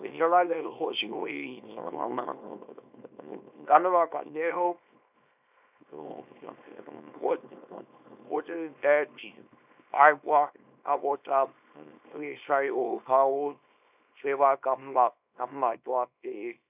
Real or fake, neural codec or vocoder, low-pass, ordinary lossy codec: fake; codec, 24 kHz, 0.9 kbps, WavTokenizer, small release; 3.6 kHz; none